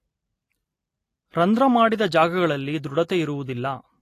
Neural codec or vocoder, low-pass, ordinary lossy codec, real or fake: none; 14.4 kHz; AAC, 48 kbps; real